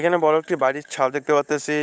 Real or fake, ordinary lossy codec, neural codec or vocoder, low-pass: fake; none; codec, 16 kHz, 8 kbps, FunCodec, trained on Chinese and English, 25 frames a second; none